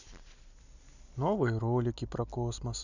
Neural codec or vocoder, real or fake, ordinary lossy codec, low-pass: none; real; none; 7.2 kHz